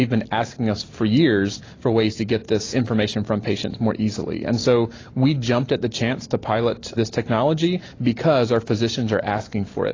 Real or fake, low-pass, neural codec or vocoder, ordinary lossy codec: real; 7.2 kHz; none; AAC, 32 kbps